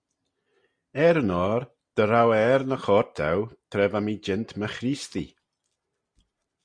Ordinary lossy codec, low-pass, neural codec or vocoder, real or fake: Opus, 64 kbps; 9.9 kHz; none; real